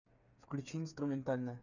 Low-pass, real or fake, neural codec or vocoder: 7.2 kHz; fake; codec, 16 kHz, 8 kbps, FreqCodec, smaller model